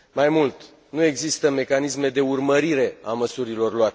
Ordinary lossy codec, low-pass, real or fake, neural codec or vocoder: none; none; real; none